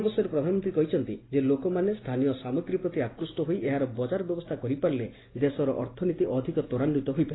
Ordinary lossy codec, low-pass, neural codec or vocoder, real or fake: AAC, 16 kbps; 7.2 kHz; none; real